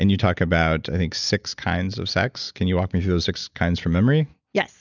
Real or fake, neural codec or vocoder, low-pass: real; none; 7.2 kHz